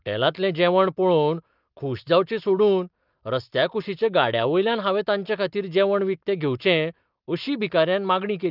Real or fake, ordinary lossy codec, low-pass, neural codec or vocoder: real; Opus, 32 kbps; 5.4 kHz; none